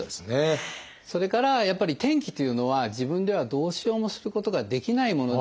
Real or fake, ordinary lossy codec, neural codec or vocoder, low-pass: real; none; none; none